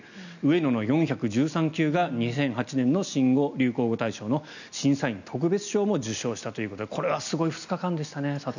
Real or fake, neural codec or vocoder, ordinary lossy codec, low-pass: real; none; none; 7.2 kHz